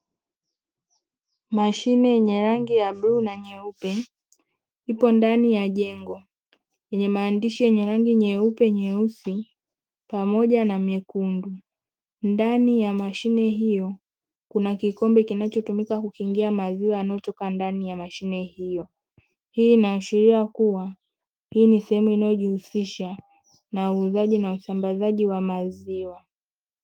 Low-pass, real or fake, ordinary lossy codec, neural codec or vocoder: 19.8 kHz; fake; Opus, 24 kbps; autoencoder, 48 kHz, 128 numbers a frame, DAC-VAE, trained on Japanese speech